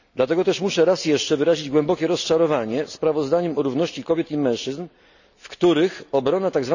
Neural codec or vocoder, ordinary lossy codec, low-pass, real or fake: none; none; 7.2 kHz; real